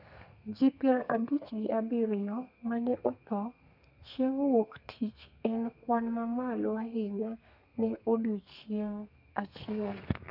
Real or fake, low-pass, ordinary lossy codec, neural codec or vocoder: fake; 5.4 kHz; none; codec, 32 kHz, 1.9 kbps, SNAC